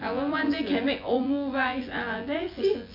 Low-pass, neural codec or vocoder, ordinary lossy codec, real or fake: 5.4 kHz; vocoder, 24 kHz, 100 mel bands, Vocos; MP3, 32 kbps; fake